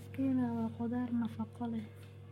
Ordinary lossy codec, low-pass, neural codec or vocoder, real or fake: MP3, 64 kbps; 19.8 kHz; codec, 44.1 kHz, 7.8 kbps, Pupu-Codec; fake